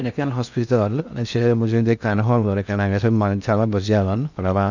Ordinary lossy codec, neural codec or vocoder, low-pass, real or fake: none; codec, 16 kHz in and 24 kHz out, 0.6 kbps, FocalCodec, streaming, 2048 codes; 7.2 kHz; fake